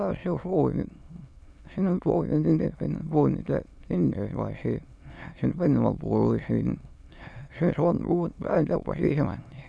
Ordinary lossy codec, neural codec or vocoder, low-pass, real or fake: none; autoencoder, 22.05 kHz, a latent of 192 numbers a frame, VITS, trained on many speakers; none; fake